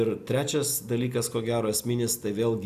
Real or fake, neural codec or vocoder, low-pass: real; none; 14.4 kHz